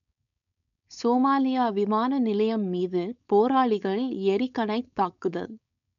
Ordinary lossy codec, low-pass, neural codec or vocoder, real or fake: none; 7.2 kHz; codec, 16 kHz, 4.8 kbps, FACodec; fake